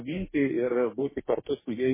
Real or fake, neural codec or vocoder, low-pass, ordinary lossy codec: fake; codec, 44.1 kHz, 2.6 kbps, DAC; 3.6 kHz; MP3, 16 kbps